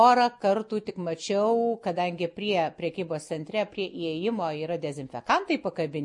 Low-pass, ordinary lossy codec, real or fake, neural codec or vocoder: 10.8 kHz; MP3, 48 kbps; real; none